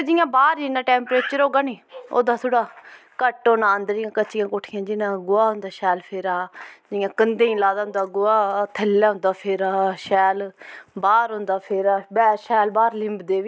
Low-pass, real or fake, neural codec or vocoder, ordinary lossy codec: none; real; none; none